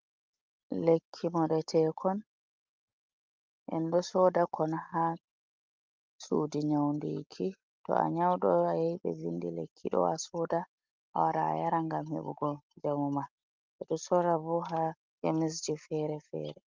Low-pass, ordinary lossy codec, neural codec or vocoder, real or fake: 7.2 kHz; Opus, 24 kbps; none; real